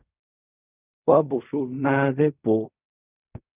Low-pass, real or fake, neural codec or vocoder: 3.6 kHz; fake; codec, 16 kHz in and 24 kHz out, 0.4 kbps, LongCat-Audio-Codec, fine tuned four codebook decoder